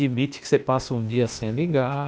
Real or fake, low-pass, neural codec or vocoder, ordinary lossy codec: fake; none; codec, 16 kHz, 0.8 kbps, ZipCodec; none